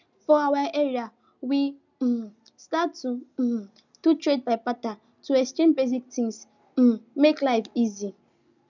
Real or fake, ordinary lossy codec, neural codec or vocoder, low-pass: real; none; none; 7.2 kHz